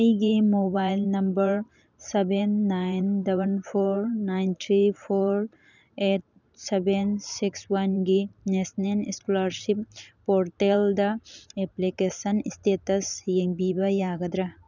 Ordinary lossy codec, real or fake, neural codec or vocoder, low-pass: none; fake; vocoder, 44.1 kHz, 128 mel bands every 512 samples, BigVGAN v2; 7.2 kHz